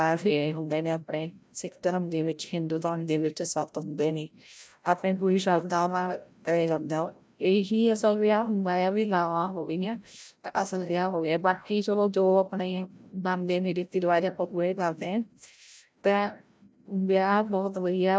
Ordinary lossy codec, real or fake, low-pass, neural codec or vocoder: none; fake; none; codec, 16 kHz, 0.5 kbps, FreqCodec, larger model